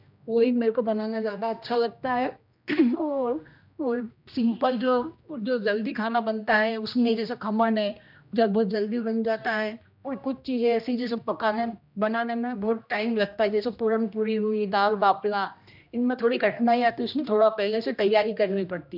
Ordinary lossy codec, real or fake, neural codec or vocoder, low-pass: none; fake; codec, 16 kHz, 1 kbps, X-Codec, HuBERT features, trained on general audio; 5.4 kHz